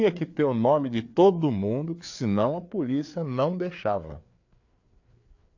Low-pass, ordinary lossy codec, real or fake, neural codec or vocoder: 7.2 kHz; AAC, 48 kbps; fake; codec, 16 kHz, 4 kbps, FreqCodec, larger model